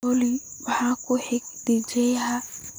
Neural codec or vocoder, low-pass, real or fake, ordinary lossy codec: none; none; real; none